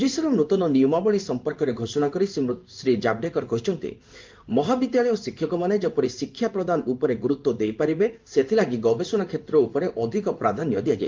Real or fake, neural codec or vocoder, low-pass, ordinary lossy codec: fake; codec, 16 kHz in and 24 kHz out, 1 kbps, XY-Tokenizer; 7.2 kHz; Opus, 32 kbps